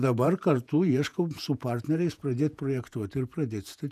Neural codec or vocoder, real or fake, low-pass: vocoder, 48 kHz, 128 mel bands, Vocos; fake; 14.4 kHz